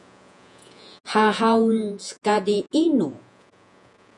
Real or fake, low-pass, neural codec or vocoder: fake; 10.8 kHz; vocoder, 48 kHz, 128 mel bands, Vocos